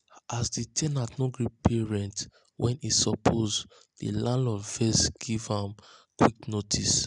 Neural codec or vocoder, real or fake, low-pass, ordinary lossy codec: none; real; 10.8 kHz; none